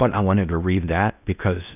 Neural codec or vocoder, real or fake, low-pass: codec, 16 kHz in and 24 kHz out, 0.8 kbps, FocalCodec, streaming, 65536 codes; fake; 3.6 kHz